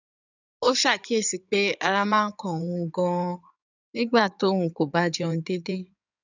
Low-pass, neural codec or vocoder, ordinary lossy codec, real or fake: 7.2 kHz; codec, 16 kHz in and 24 kHz out, 2.2 kbps, FireRedTTS-2 codec; none; fake